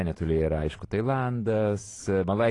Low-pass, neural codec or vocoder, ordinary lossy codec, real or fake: 10.8 kHz; vocoder, 44.1 kHz, 128 mel bands every 512 samples, BigVGAN v2; AAC, 32 kbps; fake